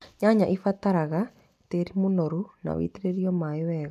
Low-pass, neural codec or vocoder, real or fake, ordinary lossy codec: 14.4 kHz; none; real; MP3, 96 kbps